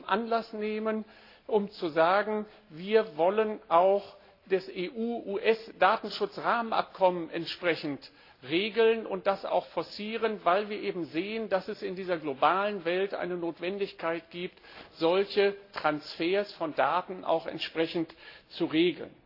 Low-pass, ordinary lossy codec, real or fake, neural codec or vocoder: 5.4 kHz; AAC, 32 kbps; real; none